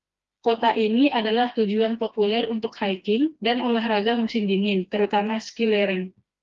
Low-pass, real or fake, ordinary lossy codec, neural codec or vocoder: 7.2 kHz; fake; Opus, 32 kbps; codec, 16 kHz, 2 kbps, FreqCodec, smaller model